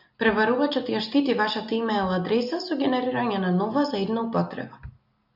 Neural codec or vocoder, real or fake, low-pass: none; real; 5.4 kHz